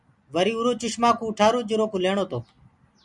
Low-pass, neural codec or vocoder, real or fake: 10.8 kHz; none; real